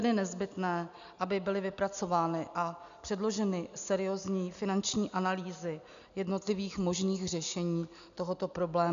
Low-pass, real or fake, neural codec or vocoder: 7.2 kHz; real; none